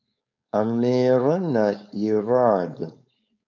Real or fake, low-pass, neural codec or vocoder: fake; 7.2 kHz; codec, 16 kHz, 4.8 kbps, FACodec